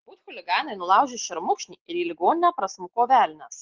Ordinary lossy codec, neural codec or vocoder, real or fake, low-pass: Opus, 16 kbps; none; real; 7.2 kHz